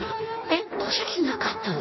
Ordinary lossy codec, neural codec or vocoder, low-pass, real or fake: MP3, 24 kbps; codec, 16 kHz in and 24 kHz out, 0.6 kbps, FireRedTTS-2 codec; 7.2 kHz; fake